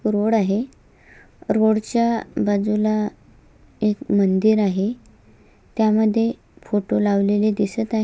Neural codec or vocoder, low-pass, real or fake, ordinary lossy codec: none; none; real; none